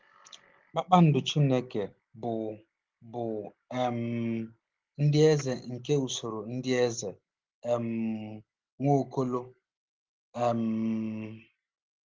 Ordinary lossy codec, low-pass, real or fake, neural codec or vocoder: Opus, 16 kbps; 7.2 kHz; real; none